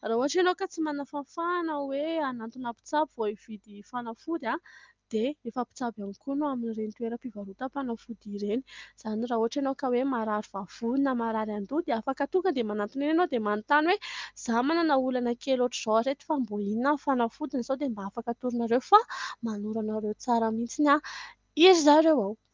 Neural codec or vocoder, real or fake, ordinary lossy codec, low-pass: none; real; Opus, 32 kbps; 7.2 kHz